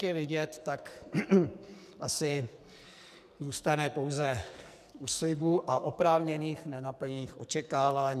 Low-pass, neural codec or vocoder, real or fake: 14.4 kHz; codec, 44.1 kHz, 2.6 kbps, SNAC; fake